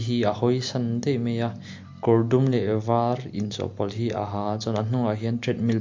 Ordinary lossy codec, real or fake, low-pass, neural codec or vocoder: MP3, 48 kbps; real; 7.2 kHz; none